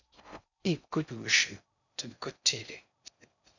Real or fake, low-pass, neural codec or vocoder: fake; 7.2 kHz; codec, 16 kHz in and 24 kHz out, 0.6 kbps, FocalCodec, streaming, 4096 codes